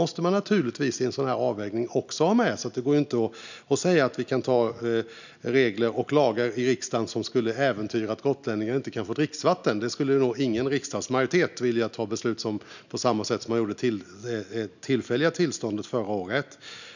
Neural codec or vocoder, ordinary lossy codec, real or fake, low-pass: none; none; real; 7.2 kHz